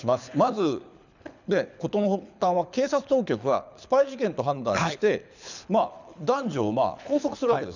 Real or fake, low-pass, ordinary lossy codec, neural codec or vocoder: fake; 7.2 kHz; none; codec, 24 kHz, 6 kbps, HILCodec